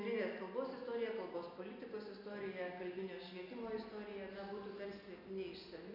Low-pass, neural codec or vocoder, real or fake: 5.4 kHz; none; real